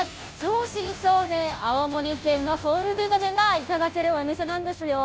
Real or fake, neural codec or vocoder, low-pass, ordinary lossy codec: fake; codec, 16 kHz, 0.5 kbps, FunCodec, trained on Chinese and English, 25 frames a second; none; none